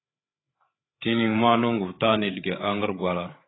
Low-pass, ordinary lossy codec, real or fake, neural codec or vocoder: 7.2 kHz; AAC, 16 kbps; fake; codec, 16 kHz, 8 kbps, FreqCodec, larger model